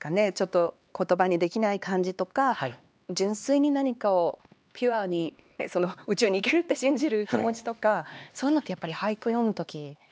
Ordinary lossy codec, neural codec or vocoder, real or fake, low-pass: none; codec, 16 kHz, 2 kbps, X-Codec, HuBERT features, trained on LibriSpeech; fake; none